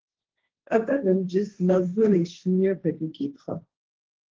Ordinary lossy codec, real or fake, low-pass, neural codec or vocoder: Opus, 16 kbps; fake; 7.2 kHz; codec, 16 kHz, 1.1 kbps, Voila-Tokenizer